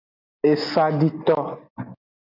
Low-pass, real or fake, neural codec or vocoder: 5.4 kHz; real; none